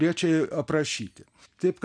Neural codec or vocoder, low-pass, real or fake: none; 9.9 kHz; real